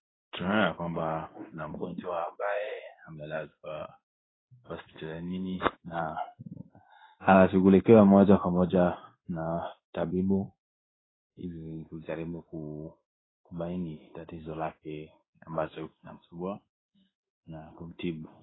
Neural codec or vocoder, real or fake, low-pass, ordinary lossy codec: codec, 16 kHz in and 24 kHz out, 1 kbps, XY-Tokenizer; fake; 7.2 kHz; AAC, 16 kbps